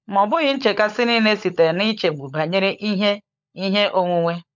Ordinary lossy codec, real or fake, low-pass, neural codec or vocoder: MP3, 64 kbps; fake; 7.2 kHz; codec, 16 kHz, 16 kbps, FunCodec, trained on LibriTTS, 50 frames a second